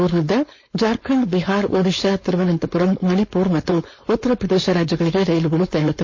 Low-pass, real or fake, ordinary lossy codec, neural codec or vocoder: 7.2 kHz; fake; MP3, 32 kbps; codec, 16 kHz, 4.8 kbps, FACodec